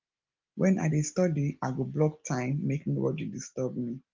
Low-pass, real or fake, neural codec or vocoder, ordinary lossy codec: 7.2 kHz; real; none; Opus, 32 kbps